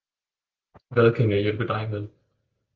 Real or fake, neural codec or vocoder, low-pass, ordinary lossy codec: real; none; 7.2 kHz; Opus, 32 kbps